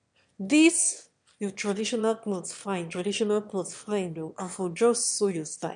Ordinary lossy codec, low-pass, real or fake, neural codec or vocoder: none; 9.9 kHz; fake; autoencoder, 22.05 kHz, a latent of 192 numbers a frame, VITS, trained on one speaker